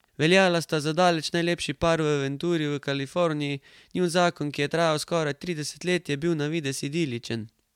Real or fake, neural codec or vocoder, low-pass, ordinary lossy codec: real; none; 19.8 kHz; MP3, 96 kbps